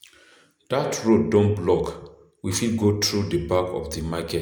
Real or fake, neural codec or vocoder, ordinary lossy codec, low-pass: real; none; none; none